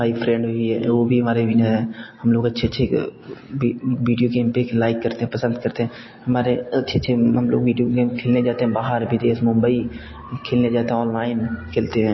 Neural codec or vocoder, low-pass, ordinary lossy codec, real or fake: none; 7.2 kHz; MP3, 24 kbps; real